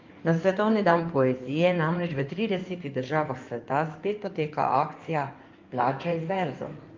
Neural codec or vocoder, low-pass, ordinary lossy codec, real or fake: codec, 16 kHz in and 24 kHz out, 2.2 kbps, FireRedTTS-2 codec; 7.2 kHz; Opus, 32 kbps; fake